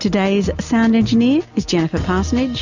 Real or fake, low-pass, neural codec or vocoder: real; 7.2 kHz; none